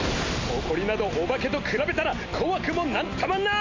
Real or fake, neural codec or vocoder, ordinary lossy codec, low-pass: real; none; AAC, 32 kbps; 7.2 kHz